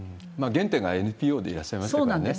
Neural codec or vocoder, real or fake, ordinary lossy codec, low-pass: none; real; none; none